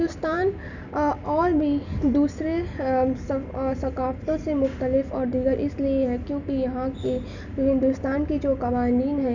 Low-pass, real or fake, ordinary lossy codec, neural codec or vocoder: 7.2 kHz; real; none; none